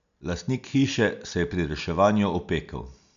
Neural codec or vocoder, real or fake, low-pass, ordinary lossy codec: none; real; 7.2 kHz; none